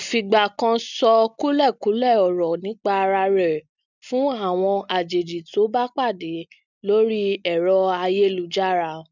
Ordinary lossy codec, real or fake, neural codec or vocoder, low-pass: none; real; none; 7.2 kHz